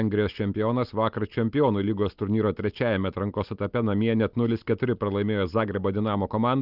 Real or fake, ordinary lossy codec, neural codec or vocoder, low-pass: real; Opus, 32 kbps; none; 5.4 kHz